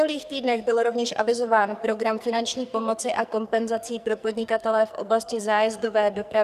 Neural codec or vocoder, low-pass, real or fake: codec, 44.1 kHz, 2.6 kbps, SNAC; 14.4 kHz; fake